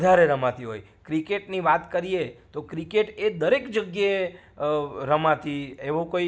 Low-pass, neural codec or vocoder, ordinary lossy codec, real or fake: none; none; none; real